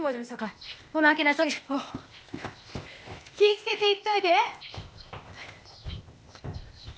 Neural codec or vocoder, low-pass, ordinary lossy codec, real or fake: codec, 16 kHz, 0.8 kbps, ZipCodec; none; none; fake